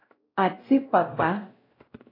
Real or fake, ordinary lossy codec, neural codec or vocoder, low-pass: fake; AAC, 24 kbps; codec, 16 kHz, 0.5 kbps, X-Codec, WavLM features, trained on Multilingual LibriSpeech; 5.4 kHz